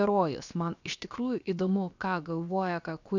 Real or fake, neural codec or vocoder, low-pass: fake; codec, 16 kHz, 0.7 kbps, FocalCodec; 7.2 kHz